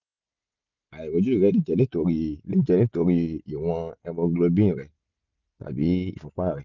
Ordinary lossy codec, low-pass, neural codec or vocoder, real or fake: none; 7.2 kHz; none; real